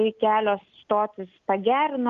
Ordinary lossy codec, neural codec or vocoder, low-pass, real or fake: Opus, 32 kbps; none; 7.2 kHz; real